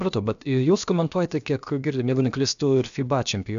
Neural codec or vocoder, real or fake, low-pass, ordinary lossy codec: codec, 16 kHz, about 1 kbps, DyCAST, with the encoder's durations; fake; 7.2 kHz; AAC, 96 kbps